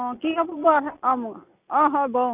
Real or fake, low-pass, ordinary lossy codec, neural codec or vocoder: real; 3.6 kHz; Opus, 64 kbps; none